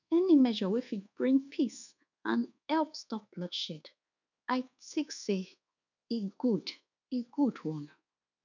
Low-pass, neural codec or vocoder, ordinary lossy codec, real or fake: 7.2 kHz; codec, 24 kHz, 1.2 kbps, DualCodec; none; fake